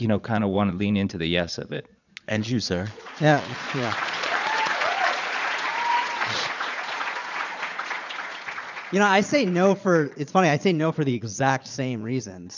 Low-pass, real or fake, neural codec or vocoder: 7.2 kHz; fake; vocoder, 22.05 kHz, 80 mel bands, Vocos